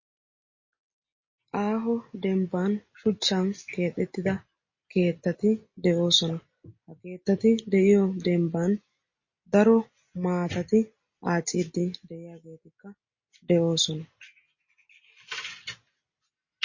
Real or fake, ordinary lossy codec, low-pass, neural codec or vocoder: real; MP3, 32 kbps; 7.2 kHz; none